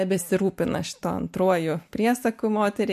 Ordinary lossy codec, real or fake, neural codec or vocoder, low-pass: MP3, 64 kbps; real; none; 14.4 kHz